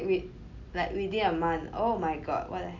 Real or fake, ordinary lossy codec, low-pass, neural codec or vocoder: real; none; 7.2 kHz; none